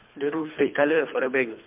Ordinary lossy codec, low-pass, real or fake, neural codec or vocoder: MP3, 32 kbps; 3.6 kHz; fake; codec, 24 kHz, 3 kbps, HILCodec